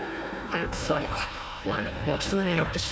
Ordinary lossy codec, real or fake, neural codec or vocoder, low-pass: none; fake; codec, 16 kHz, 1 kbps, FunCodec, trained on Chinese and English, 50 frames a second; none